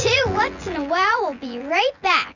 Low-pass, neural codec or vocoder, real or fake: 7.2 kHz; vocoder, 24 kHz, 100 mel bands, Vocos; fake